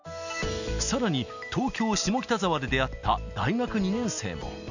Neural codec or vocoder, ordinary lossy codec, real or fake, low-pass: none; none; real; 7.2 kHz